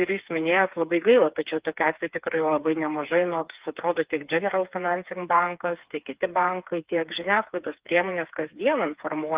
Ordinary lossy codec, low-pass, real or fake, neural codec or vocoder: Opus, 32 kbps; 3.6 kHz; fake; codec, 16 kHz, 4 kbps, FreqCodec, smaller model